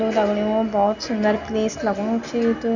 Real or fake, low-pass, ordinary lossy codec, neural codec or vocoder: real; 7.2 kHz; none; none